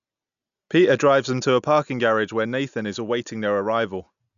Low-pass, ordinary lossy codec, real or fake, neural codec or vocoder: 7.2 kHz; MP3, 96 kbps; real; none